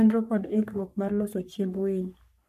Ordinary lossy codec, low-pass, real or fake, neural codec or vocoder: none; 14.4 kHz; fake; codec, 44.1 kHz, 3.4 kbps, Pupu-Codec